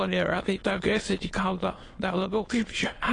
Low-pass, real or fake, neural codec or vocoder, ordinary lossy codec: 9.9 kHz; fake; autoencoder, 22.05 kHz, a latent of 192 numbers a frame, VITS, trained on many speakers; AAC, 32 kbps